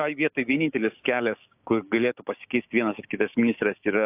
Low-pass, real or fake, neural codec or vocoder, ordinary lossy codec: 3.6 kHz; fake; codec, 16 kHz, 6 kbps, DAC; AAC, 32 kbps